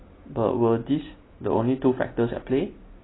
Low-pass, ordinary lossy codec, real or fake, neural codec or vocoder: 7.2 kHz; AAC, 16 kbps; real; none